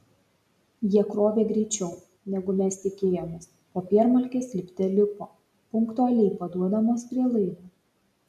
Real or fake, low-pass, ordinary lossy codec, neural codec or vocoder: real; 14.4 kHz; MP3, 96 kbps; none